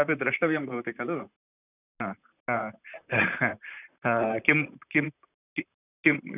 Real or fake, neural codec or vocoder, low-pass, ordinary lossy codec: fake; vocoder, 44.1 kHz, 128 mel bands, Pupu-Vocoder; 3.6 kHz; none